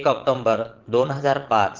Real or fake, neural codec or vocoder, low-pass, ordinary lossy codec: fake; vocoder, 22.05 kHz, 80 mel bands, Vocos; 7.2 kHz; Opus, 16 kbps